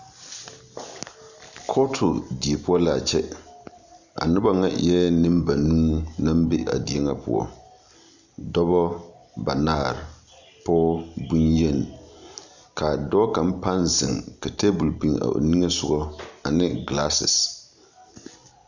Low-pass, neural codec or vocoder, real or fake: 7.2 kHz; none; real